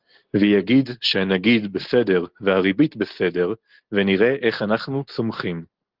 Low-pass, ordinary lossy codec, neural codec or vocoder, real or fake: 5.4 kHz; Opus, 16 kbps; none; real